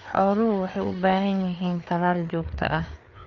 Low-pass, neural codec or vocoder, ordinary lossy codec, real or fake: 7.2 kHz; codec, 16 kHz, 4 kbps, FreqCodec, larger model; MP3, 48 kbps; fake